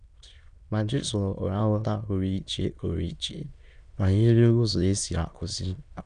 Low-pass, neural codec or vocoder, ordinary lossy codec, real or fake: 9.9 kHz; autoencoder, 22.05 kHz, a latent of 192 numbers a frame, VITS, trained on many speakers; none; fake